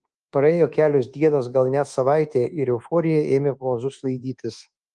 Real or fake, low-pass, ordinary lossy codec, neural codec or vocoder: fake; 10.8 kHz; Opus, 24 kbps; codec, 24 kHz, 1.2 kbps, DualCodec